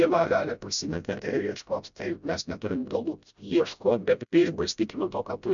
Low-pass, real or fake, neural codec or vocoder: 7.2 kHz; fake; codec, 16 kHz, 0.5 kbps, FreqCodec, smaller model